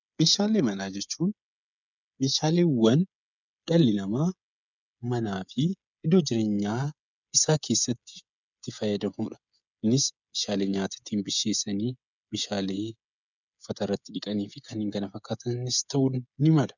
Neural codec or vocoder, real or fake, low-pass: codec, 16 kHz, 16 kbps, FreqCodec, smaller model; fake; 7.2 kHz